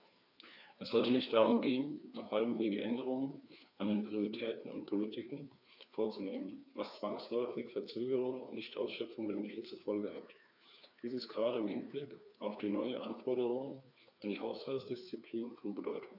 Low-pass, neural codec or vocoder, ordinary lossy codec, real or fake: 5.4 kHz; codec, 16 kHz, 2 kbps, FreqCodec, larger model; none; fake